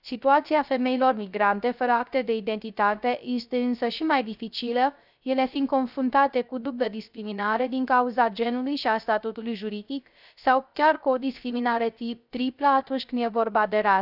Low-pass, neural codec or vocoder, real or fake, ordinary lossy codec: 5.4 kHz; codec, 16 kHz, 0.3 kbps, FocalCodec; fake; none